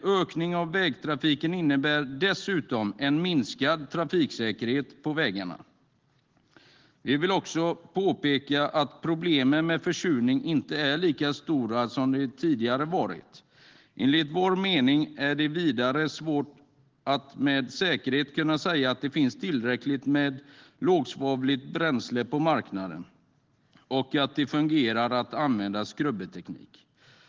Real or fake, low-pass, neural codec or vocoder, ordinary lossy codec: real; 7.2 kHz; none; Opus, 16 kbps